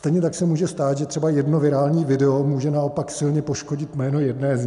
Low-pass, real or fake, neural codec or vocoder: 10.8 kHz; real; none